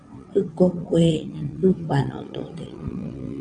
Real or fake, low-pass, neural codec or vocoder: fake; 9.9 kHz; vocoder, 22.05 kHz, 80 mel bands, WaveNeXt